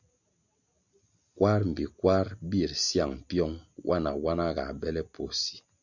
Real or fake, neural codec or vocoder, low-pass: real; none; 7.2 kHz